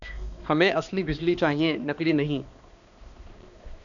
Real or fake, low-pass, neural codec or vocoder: fake; 7.2 kHz; codec, 16 kHz, 2 kbps, X-Codec, HuBERT features, trained on balanced general audio